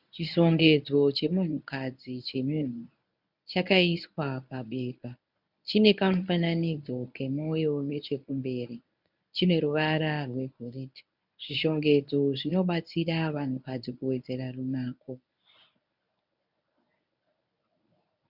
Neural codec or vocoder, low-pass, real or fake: codec, 24 kHz, 0.9 kbps, WavTokenizer, medium speech release version 1; 5.4 kHz; fake